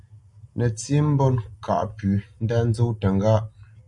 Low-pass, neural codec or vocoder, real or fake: 10.8 kHz; none; real